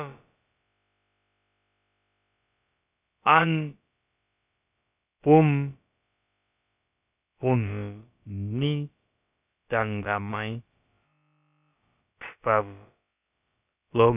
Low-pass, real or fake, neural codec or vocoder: 3.6 kHz; fake; codec, 16 kHz, about 1 kbps, DyCAST, with the encoder's durations